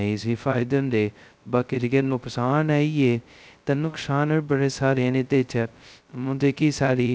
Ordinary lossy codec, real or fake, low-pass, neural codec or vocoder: none; fake; none; codec, 16 kHz, 0.2 kbps, FocalCodec